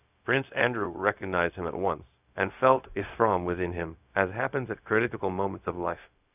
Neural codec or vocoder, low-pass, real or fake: codec, 16 kHz, 0.4 kbps, LongCat-Audio-Codec; 3.6 kHz; fake